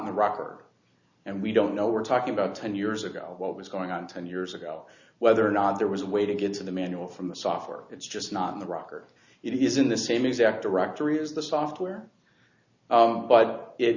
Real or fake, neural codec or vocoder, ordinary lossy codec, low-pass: real; none; Opus, 64 kbps; 7.2 kHz